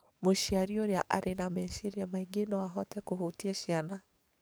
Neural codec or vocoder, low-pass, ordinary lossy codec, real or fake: codec, 44.1 kHz, 7.8 kbps, DAC; none; none; fake